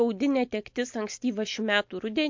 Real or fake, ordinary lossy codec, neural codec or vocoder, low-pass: real; MP3, 48 kbps; none; 7.2 kHz